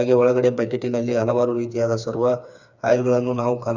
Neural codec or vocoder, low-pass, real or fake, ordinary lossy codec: codec, 16 kHz, 4 kbps, FreqCodec, smaller model; 7.2 kHz; fake; none